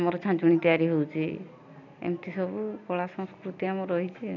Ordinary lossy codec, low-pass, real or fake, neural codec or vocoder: none; 7.2 kHz; real; none